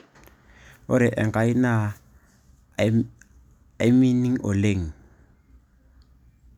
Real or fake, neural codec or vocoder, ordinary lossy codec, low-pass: real; none; none; 19.8 kHz